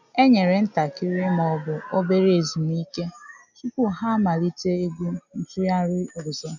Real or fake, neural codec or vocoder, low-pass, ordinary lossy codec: real; none; 7.2 kHz; none